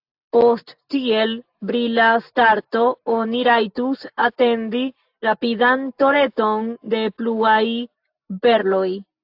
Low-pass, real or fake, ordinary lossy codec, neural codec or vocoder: 5.4 kHz; real; AAC, 48 kbps; none